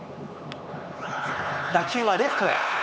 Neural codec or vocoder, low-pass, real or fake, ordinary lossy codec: codec, 16 kHz, 4 kbps, X-Codec, HuBERT features, trained on LibriSpeech; none; fake; none